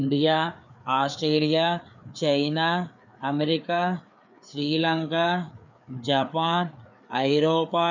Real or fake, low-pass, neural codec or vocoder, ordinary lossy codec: fake; 7.2 kHz; codec, 16 kHz, 4 kbps, FreqCodec, larger model; none